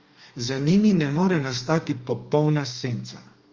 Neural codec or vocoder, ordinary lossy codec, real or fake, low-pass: codec, 32 kHz, 1.9 kbps, SNAC; Opus, 32 kbps; fake; 7.2 kHz